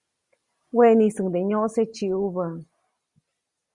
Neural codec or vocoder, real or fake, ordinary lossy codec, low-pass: none; real; Opus, 64 kbps; 10.8 kHz